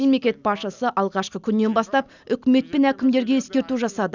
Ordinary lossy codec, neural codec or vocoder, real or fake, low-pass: none; none; real; 7.2 kHz